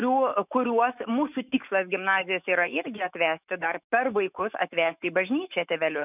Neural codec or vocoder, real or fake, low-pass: autoencoder, 48 kHz, 128 numbers a frame, DAC-VAE, trained on Japanese speech; fake; 3.6 kHz